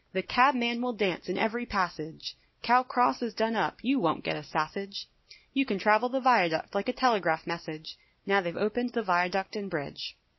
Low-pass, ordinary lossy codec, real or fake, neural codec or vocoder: 7.2 kHz; MP3, 24 kbps; real; none